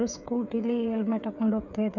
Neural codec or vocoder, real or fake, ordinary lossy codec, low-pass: codec, 16 kHz, 8 kbps, FreqCodec, smaller model; fake; none; 7.2 kHz